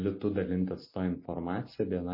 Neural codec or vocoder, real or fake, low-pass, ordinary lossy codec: none; real; 5.4 kHz; MP3, 24 kbps